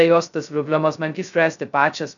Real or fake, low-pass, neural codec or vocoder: fake; 7.2 kHz; codec, 16 kHz, 0.2 kbps, FocalCodec